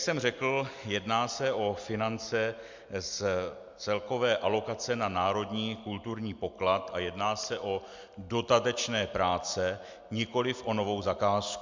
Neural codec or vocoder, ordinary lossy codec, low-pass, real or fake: none; MP3, 64 kbps; 7.2 kHz; real